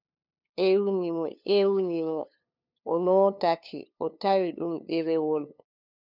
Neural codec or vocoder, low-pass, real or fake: codec, 16 kHz, 2 kbps, FunCodec, trained on LibriTTS, 25 frames a second; 5.4 kHz; fake